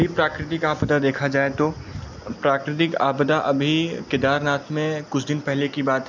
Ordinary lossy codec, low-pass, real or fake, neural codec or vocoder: none; 7.2 kHz; real; none